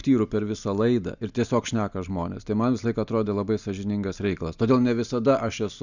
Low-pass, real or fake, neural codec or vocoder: 7.2 kHz; real; none